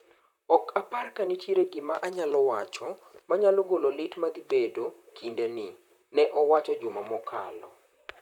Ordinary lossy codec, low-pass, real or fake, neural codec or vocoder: none; 19.8 kHz; fake; vocoder, 44.1 kHz, 128 mel bands, Pupu-Vocoder